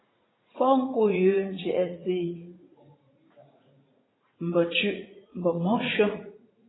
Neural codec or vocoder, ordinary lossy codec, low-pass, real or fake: vocoder, 44.1 kHz, 80 mel bands, Vocos; AAC, 16 kbps; 7.2 kHz; fake